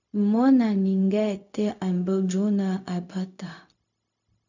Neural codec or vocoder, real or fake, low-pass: codec, 16 kHz, 0.4 kbps, LongCat-Audio-Codec; fake; 7.2 kHz